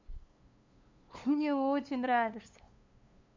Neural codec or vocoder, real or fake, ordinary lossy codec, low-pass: codec, 16 kHz, 2 kbps, FunCodec, trained on LibriTTS, 25 frames a second; fake; MP3, 64 kbps; 7.2 kHz